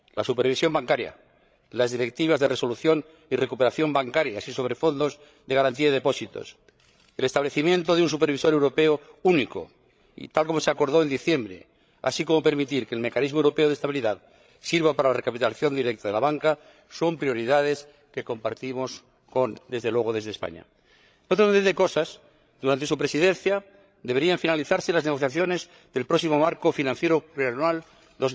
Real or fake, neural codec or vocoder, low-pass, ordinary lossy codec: fake; codec, 16 kHz, 16 kbps, FreqCodec, larger model; none; none